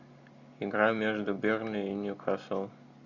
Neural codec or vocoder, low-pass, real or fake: none; 7.2 kHz; real